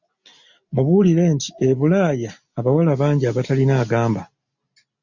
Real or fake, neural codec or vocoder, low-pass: real; none; 7.2 kHz